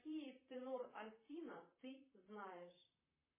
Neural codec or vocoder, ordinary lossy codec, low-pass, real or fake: vocoder, 44.1 kHz, 128 mel bands, Pupu-Vocoder; MP3, 16 kbps; 3.6 kHz; fake